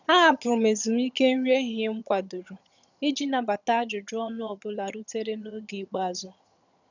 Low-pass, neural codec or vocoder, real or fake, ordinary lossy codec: 7.2 kHz; vocoder, 22.05 kHz, 80 mel bands, HiFi-GAN; fake; none